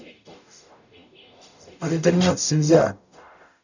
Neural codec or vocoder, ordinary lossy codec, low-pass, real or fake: codec, 44.1 kHz, 0.9 kbps, DAC; none; 7.2 kHz; fake